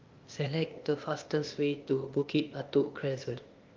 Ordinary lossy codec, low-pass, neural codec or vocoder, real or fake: Opus, 32 kbps; 7.2 kHz; codec, 16 kHz, 0.8 kbps, ZipCodec; fake